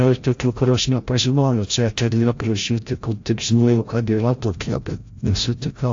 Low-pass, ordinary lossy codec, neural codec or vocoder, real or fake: 7.2 kHz; AAC, 48 kbps; codec, 16 kHz, 0.5 kbps, FreqCodec, larger model; fake